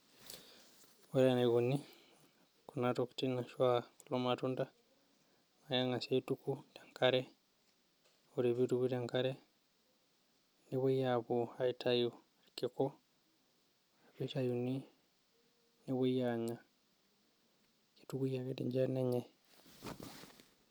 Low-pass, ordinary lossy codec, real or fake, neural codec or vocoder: none; none; real; none